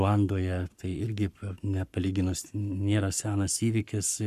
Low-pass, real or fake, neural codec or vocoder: 14.4 kHz; fake; codec, 44.1 kHz, 7.8 kbps, Pupu-Codec